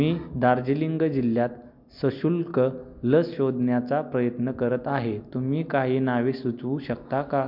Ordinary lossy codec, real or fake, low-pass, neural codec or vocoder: MP3, 48 kbps; real; 5.4 kHz; none